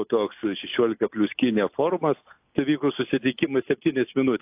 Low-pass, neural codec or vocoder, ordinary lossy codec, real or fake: 3.6 kHz; none; AAC, 32 kbps; real